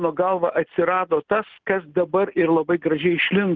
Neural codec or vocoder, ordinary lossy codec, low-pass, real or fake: none; Opus, 24 kbps; 7.2 kHz; real